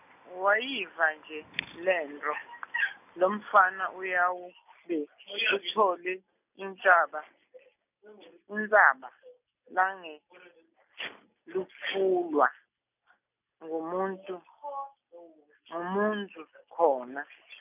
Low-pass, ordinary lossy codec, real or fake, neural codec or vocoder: 3.6 kHz; none; real; none